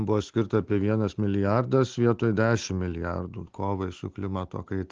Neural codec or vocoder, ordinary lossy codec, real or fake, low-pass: none; Opus, 16 kbps; real; 7.2 kHz